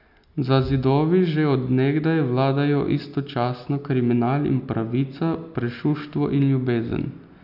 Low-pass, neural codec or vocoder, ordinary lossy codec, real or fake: 5.4 kHz; none; none; real